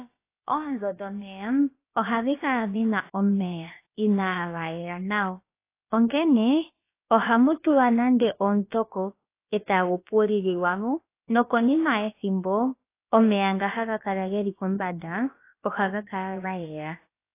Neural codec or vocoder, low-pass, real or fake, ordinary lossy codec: codec, 16 kHz, about 1 kbps, DyCAST, with the encoder's durations; 3.6 kHz; fake; AAC, 24 kbps